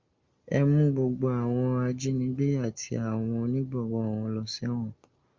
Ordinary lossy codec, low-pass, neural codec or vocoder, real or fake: Opus, 32 kbps; 7.2 kHz; none; real